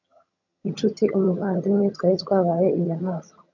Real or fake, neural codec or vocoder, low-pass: fake; vocoder, 22.05 kHz, 80 mel bands, HiFi-GAN; 7.2 kHz